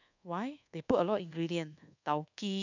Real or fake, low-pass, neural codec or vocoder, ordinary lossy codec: fake; 7.2 kHz; codec, 24 kHz, 1.2 kbps, DualCodec; none